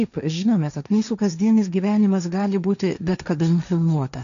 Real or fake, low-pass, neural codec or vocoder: fake; 7.2 kHz; codec, 16 kHz, 1.1 kbps, Voila-Tokenizer